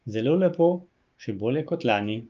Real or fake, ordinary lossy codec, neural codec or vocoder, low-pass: fake; Opus, 24 kbps; codec, 16 kHz, 6 kbps, DAC; 7.2 kHz